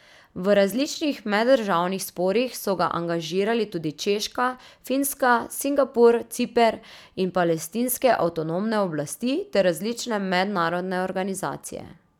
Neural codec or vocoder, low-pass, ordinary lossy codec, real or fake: none; 19.8 kHz; none; real